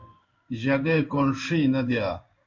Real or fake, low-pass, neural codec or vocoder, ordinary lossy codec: fake; 7.2 kHz; codec, 16 kHz in and 24 kHz out, 1 kbps, XY-Tokenizer; MP3, 48 kbps